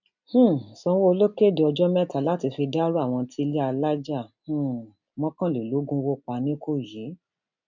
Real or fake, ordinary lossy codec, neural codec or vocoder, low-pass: real; none; none; 7.2 kHz